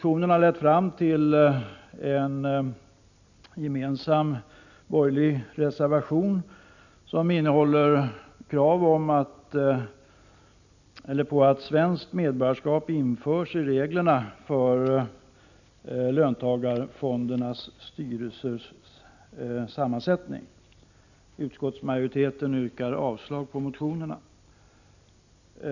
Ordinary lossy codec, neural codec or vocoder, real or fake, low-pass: none; none; real; 7.2 kHz